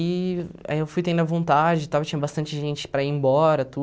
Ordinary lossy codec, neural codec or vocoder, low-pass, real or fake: none; none; none; real